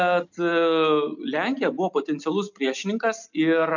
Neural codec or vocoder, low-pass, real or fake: none; 7.2 kHz; real